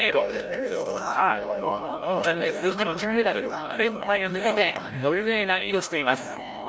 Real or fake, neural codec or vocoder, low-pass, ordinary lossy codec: fake; codec, 16 kHz, 0.5 kbps, FreqCodec, larger model; none; none